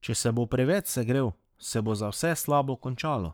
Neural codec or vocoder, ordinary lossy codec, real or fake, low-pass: codec, 44.1 kHz, 7.8 kbps, Pupu-Codec; none; fake; none